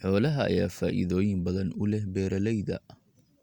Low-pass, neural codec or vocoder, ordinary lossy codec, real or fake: 19.8 kHz; none; Opus, 64 kbps; real